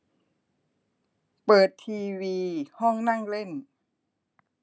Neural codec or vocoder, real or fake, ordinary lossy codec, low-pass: none; real; none; none